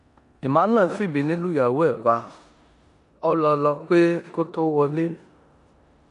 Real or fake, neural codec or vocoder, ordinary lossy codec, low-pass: fake; codec, 16 kHz in and 24 kHz out, 0.9 kbps, LongCat-Audio-Codec, four codebook decoder; AAC, 96 kbps; 10.8 kHz